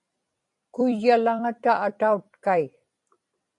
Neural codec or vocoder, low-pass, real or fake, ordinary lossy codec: vocoder, 44.1 kHz, 128 mel bands every 256 samples, BigVGAN v2; 10.8 kHz; fake; AAC, 64 kbps